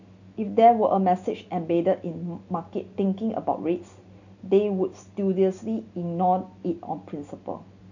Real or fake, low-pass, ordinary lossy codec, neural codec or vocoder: real; 7.2 kHz; none; none